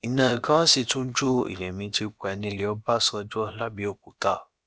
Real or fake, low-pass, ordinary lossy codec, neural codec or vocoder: fake; none; none; codec, 16 kHz, about 1 kbps, DyCAST, with the encoder's durations